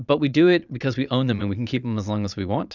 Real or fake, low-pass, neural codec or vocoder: fake; 7.2 kHz; vocoder, 22.05 kHz, 80 mel bands, Vocos